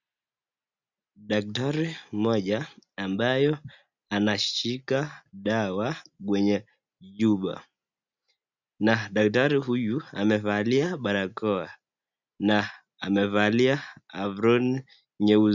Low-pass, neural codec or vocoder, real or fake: 7.2 kHz; none; real